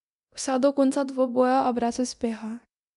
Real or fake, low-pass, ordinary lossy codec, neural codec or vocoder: fake; 10.8 kHz; MP3, 96 kbps; codec, 24 kHz, 0.9 kbps, DualCodec